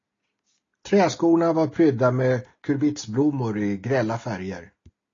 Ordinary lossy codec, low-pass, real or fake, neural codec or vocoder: AAC, 32 kbps; 7.2 kHz; real; none